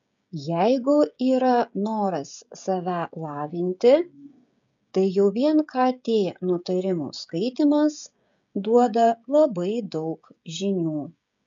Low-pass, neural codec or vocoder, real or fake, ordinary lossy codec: 7.2 kHz; codec, 16 kHz, 16 kbps, FreqCodec, smaller model; fake; MP3, 64 kbps